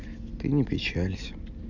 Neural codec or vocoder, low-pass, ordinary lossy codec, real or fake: none; 7.2 kHz; none; real